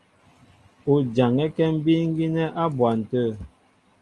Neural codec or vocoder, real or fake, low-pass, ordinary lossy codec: none; real; 10.8 kHz; Opus, 32 kbps